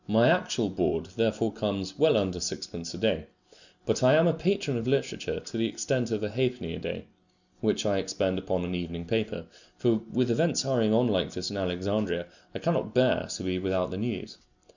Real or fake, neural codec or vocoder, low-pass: real; none; 7.2 kHz